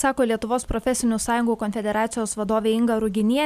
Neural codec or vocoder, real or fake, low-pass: none; real; 14.4 kHz